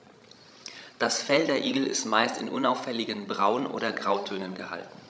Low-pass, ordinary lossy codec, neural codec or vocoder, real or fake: none; none; codec, 16 kHz, 16 kbps, FreqCodec, larger model; fake